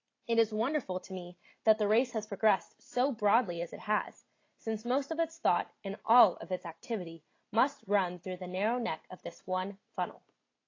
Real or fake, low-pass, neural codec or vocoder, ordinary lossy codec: real; 7.2 kHz; none; AAC, 32 kbps